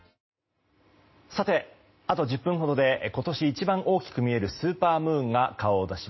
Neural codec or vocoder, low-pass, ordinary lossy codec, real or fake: none; 7.2 kHz; MP3, 24 kbps; real